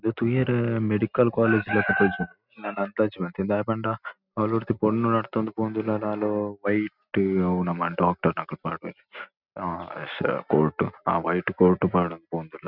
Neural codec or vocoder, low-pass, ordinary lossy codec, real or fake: none; 5.4 kHz; none; real